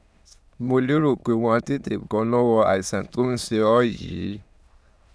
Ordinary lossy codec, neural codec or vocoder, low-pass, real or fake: none; autoencoder, 22.05 kHz, a latent of 192 numbers a frame, VITS, trained on many speakers; none; fake